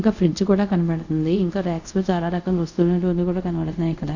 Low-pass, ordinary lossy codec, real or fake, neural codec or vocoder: 7.2 kHz; none; fake; codec, 24 kHz, 0.5 kbps, DualCodec